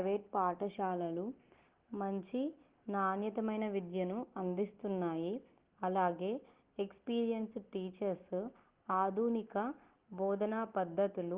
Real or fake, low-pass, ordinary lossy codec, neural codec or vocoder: real; 3.6 kHz; Opus, 16 kbps; none